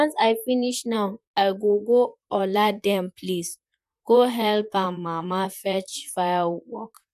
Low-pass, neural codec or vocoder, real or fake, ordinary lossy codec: 14.4 kHz; vocoder, 44.1 kHz, 128 mel bands, Pupu-Vocoder; fake; none